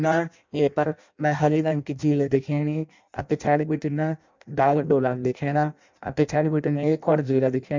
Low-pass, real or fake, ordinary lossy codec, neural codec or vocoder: 7.2 kHz; fake; MP3, 64 kbps; codec, 16 kHz in and 24 kHz out, 0.6 kbps, FireRedTTS-2 codec